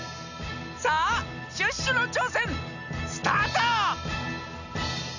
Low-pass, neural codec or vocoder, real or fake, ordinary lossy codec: 7.2 kHz; none; real; none